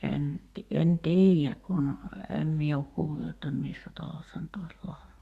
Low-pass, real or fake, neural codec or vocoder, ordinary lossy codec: 14.4 kHz; fake; codec, 32 kHz, 1.9 kbps, SNAC; Opus, 64 kbps